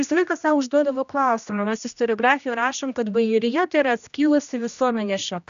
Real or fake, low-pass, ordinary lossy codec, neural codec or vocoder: fake; 7.2 kHz; AAC, 96 kbps; codec, 16 kHz, 1 kbps, X-Codec, HuBERT features, trained on general audio